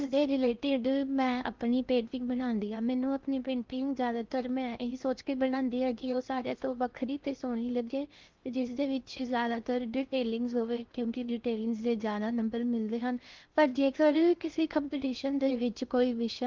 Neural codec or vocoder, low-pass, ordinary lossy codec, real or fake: codec, 16 kHz in and 24 kHz out, 0.6 kbps, FocalCodec, streaming, 4096 codes; 7.2 kHz; Opus, 32 kbps; fake